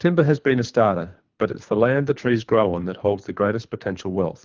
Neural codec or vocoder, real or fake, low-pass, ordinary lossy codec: codec, 24 kHz, 3 kbps, HILCodec; fake; 7.2 kHz; Opus, 32 kbps